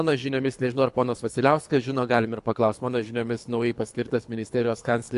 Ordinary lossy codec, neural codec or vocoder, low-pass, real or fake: AAC, 64 kbps; codec, 24 kHz, 3 kbps, HILCodec; 10.8 kHz; fake